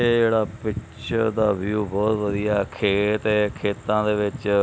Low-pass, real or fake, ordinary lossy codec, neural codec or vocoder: none; real; none; none